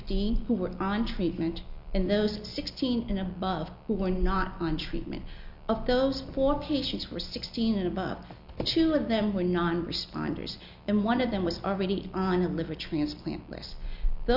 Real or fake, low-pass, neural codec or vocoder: real; 5.4 kHz; none